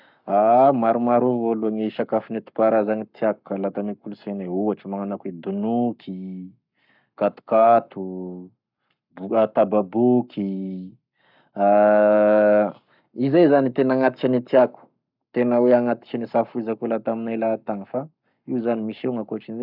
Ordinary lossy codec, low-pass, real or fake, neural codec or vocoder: none; 5.4 kHz; fake; codec, 44.1 kHz, 7.8 kbps, Pupu-Codec